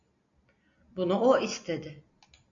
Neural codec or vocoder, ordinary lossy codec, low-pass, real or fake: none; MP3, 96 kbps; 7.2 kHz; real